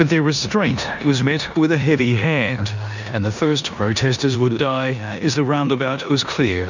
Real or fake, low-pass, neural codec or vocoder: fake; 7.2 kHz; codec, 16 kHz in and 24 kHz out, 0.9 kbps, LongCat-Audio-Codec, four codebook decoder